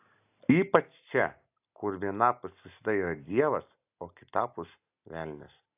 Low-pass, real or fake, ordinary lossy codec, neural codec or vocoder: 3.6 kHz; real; AAC, 32 kbps; none